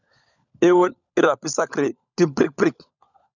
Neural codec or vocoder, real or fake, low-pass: codec, 16 kHz, 16 kbps, FunCodec, trained on LibriTTS, 50 frames a second; fake; 7.2 kHz